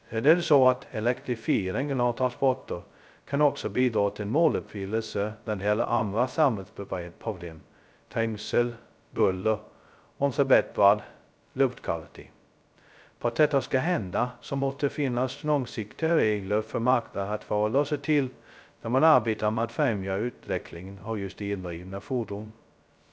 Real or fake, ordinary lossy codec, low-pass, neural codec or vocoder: fake; none; none; codec, 16 kHz, 0.2 kbps, FocalCodec